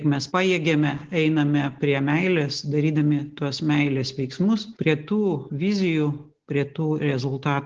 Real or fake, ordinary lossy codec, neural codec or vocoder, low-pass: real; Opus, 16 kbps; none; 7.2 kHz